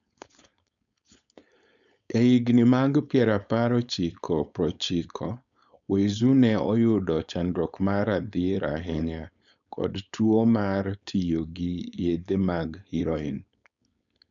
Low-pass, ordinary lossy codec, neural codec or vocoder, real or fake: 7.2 kHz; none; codec, 16 kHz, 4.8 kbps, FACodec; fake